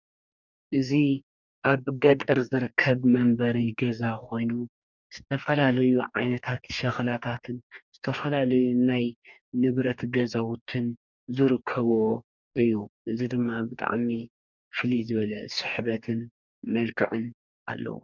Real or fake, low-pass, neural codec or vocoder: fake; 7.2 kHz; codec, 44.1 kHz, 2.6 kbps, DAC